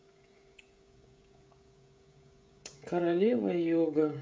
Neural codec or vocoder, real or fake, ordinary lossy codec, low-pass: codec, 16 kHz, 16 kbps, FreqCodec, smaller model; fake; none; none